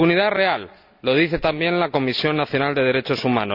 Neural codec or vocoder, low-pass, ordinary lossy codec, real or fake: none; 5.4 kHz; none; real